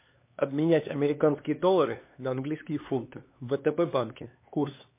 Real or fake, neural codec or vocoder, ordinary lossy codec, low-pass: fake; codec, 16 kHz, 2 kbps, X-Codec, HuBERT features, trained on LibriSpeech; MP3, 24 kbps; 3.6 kHz